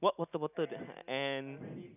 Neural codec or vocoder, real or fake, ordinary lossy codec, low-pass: none; real; none; 3.6 kHz